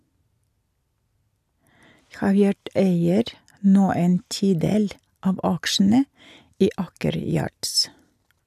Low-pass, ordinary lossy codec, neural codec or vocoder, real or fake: 14.4 kHz; AAC, 96 kbps; none; real